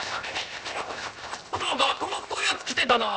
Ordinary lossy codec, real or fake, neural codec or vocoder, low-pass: none; fake; codec, 16 kHz, 0.7 kbps, FocalCodec; none